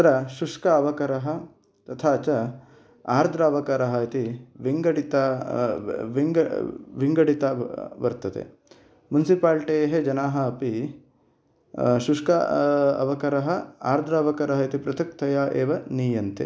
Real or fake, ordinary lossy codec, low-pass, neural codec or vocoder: real; none; none; none